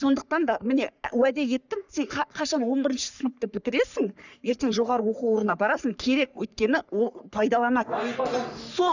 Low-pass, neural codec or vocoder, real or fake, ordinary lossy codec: 7.2 kHz; codec, 44.1 kHz, 3.4 kbps, Pupu-Codec; fake; none